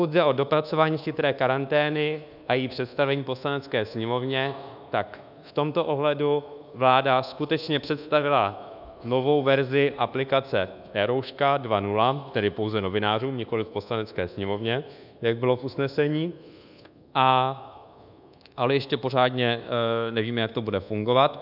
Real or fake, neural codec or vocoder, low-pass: fake; codec, 24 kHz, 1.2 kbps, DualCodec; 5.4 kHz